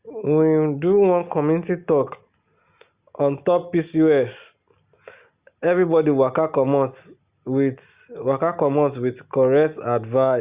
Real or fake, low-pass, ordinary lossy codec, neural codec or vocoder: real; 3.6 kHz; Opus, 64 kbps; none